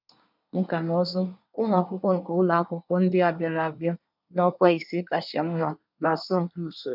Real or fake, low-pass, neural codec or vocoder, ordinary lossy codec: fake; 5.4 kHz; codec, 24 kHz, 1 kbps, SNAC; none